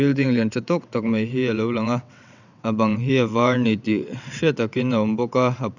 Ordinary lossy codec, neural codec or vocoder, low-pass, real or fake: none; vocoder, 22.05 kHz, 80 mel bands, WaveNeXt; 7.2 kHz; fake